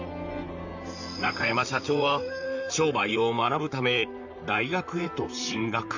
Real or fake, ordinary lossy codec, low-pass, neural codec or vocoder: fake; none; 7.2 kHz; vocoder, 44.1 kHz, 128 mel bands, Pupu-Vocoder